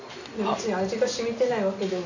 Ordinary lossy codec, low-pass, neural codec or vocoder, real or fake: none; 7.2 kHz; none; real